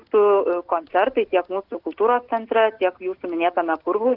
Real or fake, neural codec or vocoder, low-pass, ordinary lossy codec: real; none; 5.4 kHz; Opus, 16 kbps